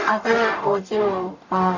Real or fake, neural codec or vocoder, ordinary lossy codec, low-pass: fake; codec, 44.1 kHz, 0.9 kbps, DAC; none; 7.2 kHz